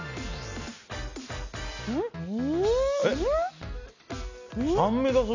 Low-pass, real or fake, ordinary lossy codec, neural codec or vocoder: 7.2 kHz; real; none; none